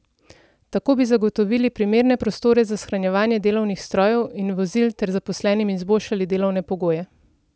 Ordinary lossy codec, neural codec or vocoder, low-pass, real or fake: none; none; none; real